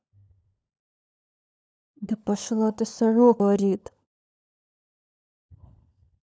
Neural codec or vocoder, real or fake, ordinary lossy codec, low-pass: codec, 16 kHz, 16 kbps, FunCodec, trained on LibriTTS, 50 frames a second; fake; none; none